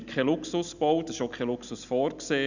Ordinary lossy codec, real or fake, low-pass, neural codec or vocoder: none; real; 7.2 kHz; none